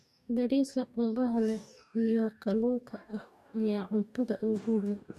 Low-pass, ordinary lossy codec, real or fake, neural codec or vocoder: 14.4 kHz; none; fake; codec, 44.1 kHz, 2.6 kbps, DAC